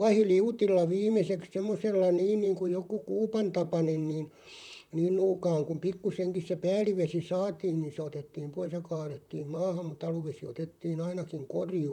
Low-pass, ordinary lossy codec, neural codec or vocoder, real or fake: 19.8 kHz; none; vocoder, 44.1 kHz, 128 mel bands, Pupu-Vocoder; fake